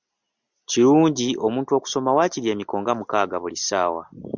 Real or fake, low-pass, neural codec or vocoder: real; 7.2 kHz; none